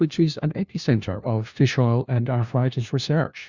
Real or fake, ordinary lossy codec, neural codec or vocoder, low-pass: fake; Opus, 64 kbps; codec, 16 kHz, 1 kbps, FunCodec, trained on LibriTTS, 50 frames a second; 7.2 kHz